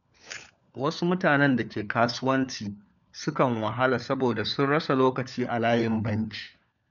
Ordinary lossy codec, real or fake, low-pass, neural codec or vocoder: none; fake; 7.2 kHz; codec, 16 kHz, 4 kbps, FunCodec, trained on LibriTTS, 50 frames a second